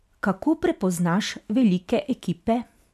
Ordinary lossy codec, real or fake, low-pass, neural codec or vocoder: none; real; 14.4 kHz; none